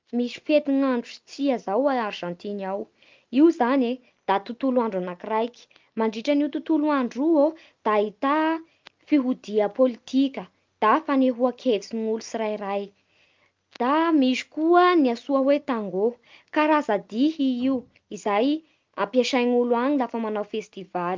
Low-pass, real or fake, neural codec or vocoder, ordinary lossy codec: 7.2 kHz; real; none; Opus, 24 kbps